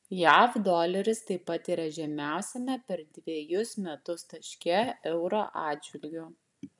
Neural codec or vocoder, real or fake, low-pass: none; real; 10.8 kHz